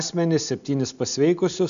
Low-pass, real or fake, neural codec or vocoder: 7.2 kHz; real; none